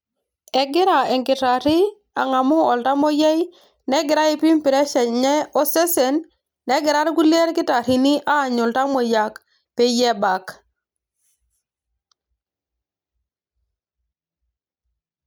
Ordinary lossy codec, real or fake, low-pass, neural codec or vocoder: none; real; none; none